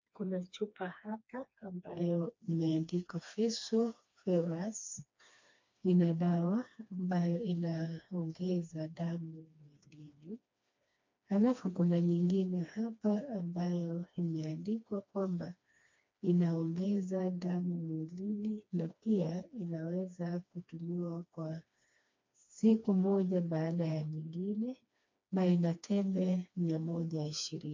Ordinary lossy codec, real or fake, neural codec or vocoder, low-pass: MP3, 48 kbps; fake; codec, 16 kHz, 2 kbps, FreqCodec, smaller model; 7.2 kHz